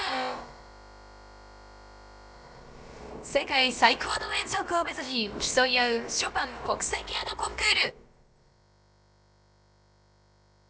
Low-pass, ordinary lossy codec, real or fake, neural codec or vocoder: none; none; fake; codec, 16 kHz, about 1 kbps, DyCAST, with the encoder's durations